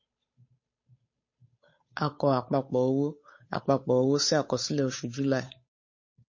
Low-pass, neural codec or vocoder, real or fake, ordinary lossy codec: 7.2 kHz; codec, 16 kHz, 8 kbps, FunCodec, trained on Chinese and English, 25 frames a second; fake; MP3, 32 kbps